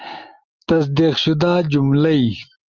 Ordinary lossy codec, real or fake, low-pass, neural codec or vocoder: Opus, 32 kbps; real; 7.2 kHz; none